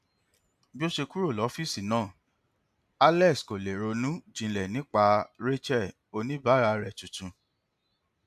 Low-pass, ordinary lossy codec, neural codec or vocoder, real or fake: 14.4 kHz; AAC, 96 kbps; none; real